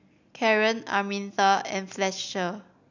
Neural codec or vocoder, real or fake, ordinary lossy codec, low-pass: none; real; none; 7.2 kHz